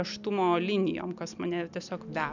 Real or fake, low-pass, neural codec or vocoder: real; 7.2 kHz; none